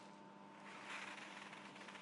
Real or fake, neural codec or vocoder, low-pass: real; none; 10.8 kHz